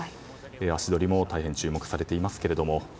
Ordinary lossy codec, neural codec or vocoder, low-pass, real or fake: none; none; none; real